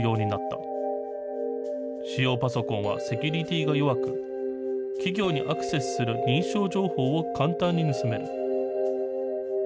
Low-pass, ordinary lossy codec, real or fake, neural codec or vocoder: none; none; real; none